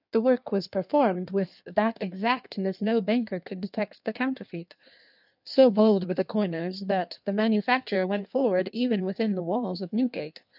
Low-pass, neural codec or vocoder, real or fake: 5.4 kHz; codec, 16 kHz in and 24 kHz out, 1.1 kbps, FireRedTTS-2 codec; fake